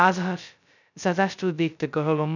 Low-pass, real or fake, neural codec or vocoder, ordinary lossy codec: 7.2 kHz; fake; codec, 16 kHz, 0.2 kbps, FocalCodec; none